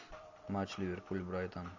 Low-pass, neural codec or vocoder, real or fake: 7.2 kHz; none; real